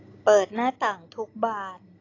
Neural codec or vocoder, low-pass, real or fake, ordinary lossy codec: none; 7.2 kHz; real; AAC, 32 kbps